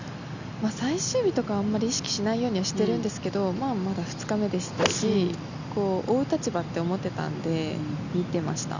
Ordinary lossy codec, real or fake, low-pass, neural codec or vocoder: none; real; 7.2 kHz; none